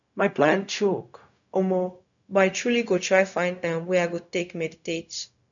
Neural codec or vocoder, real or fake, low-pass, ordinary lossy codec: codec, 16 kHz, 0.4 kbps, LongCat-Audio-Codec; fake; 7.2 kHz; none